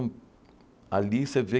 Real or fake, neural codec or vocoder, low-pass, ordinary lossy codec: real; none; none; none